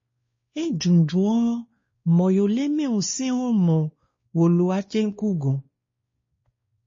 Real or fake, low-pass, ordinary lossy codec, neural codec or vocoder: fake; 7.2 kHz; MP3, 32 kbps; codec, 16 kHz, 2 kbps, X-Codec, WavLM features, trained on Multilingual LibriSpeech